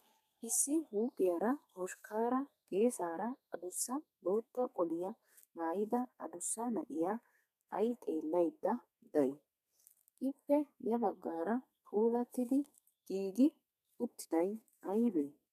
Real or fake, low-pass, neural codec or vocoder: fake; 14.4 kHz; codec, 32 kHz, 1.9 kbps, SNAC